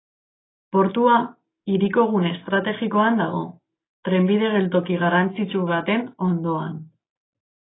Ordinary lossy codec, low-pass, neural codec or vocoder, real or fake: AAC, 16 kbps; 7.2 kHz; none; real